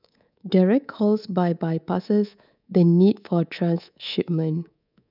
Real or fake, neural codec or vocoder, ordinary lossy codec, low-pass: fake; codec, 24 kHz, 3.1 kbps, DualCodec; none; 5.4 kHz